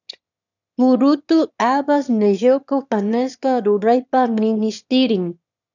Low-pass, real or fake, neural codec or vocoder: 7.2 kHz; fake; autoencoder, 22.05 kHz, a latent of 192 numbers a frame, VITS, trained on one speaker